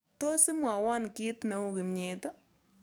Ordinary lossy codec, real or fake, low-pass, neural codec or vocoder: none; fake; none; codec, 44.1 kHz, 7.8 kbps, DAC